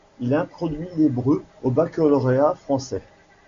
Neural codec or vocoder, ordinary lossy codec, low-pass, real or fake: none; MP3, 64 kbps; 7.2 kHz; real